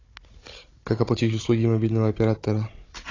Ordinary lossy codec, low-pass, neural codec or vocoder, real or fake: AAC, 32 kbps; 7.2 kHz; codec, 16 kHz, 16 kbps, FunCodec, trained on Chinese and English, 50 frames a second; fake